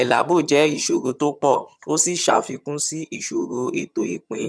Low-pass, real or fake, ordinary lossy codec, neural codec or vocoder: none; fake; none; vocoder, 22.05 kHz, 80 mel bands, HiFi-GAN